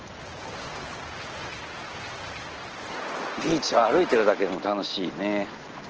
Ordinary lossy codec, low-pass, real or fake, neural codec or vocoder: Opus, 16 kbps; 7.2 kHz; real; none